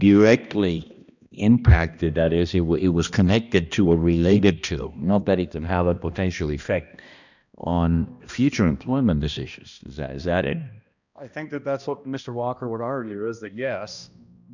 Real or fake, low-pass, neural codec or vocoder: fake; 7.2 kHz; codec, 16 kHz, 1 kbps, X-Codec, HuBERT features, trained on balanced general audio